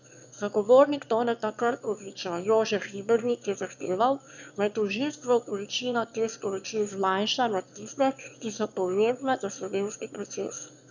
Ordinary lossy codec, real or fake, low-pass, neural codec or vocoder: none; fake; 7.2 kHz; autoencoder, 22.05 kHz, a latent of 192 numbers a frame, VITS, trained on one speaker